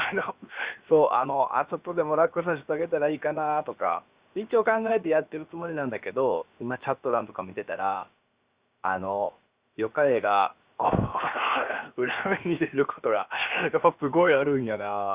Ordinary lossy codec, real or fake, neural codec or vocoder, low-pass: Opus, 64 kbps; fake; codec, 16 kHz, 0.7 kbps, FocalCodec; 3.6 kHz